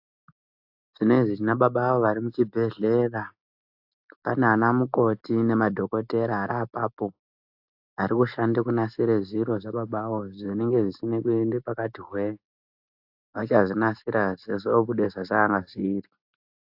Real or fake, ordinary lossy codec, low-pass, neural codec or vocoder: real; AAC, 48 kbps; 5.4 kHz; none